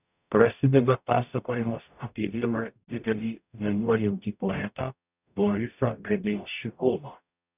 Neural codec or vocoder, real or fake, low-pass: codec, 44.1 kHz, 0.9 kbps, DAC; fake; 3.6 kHz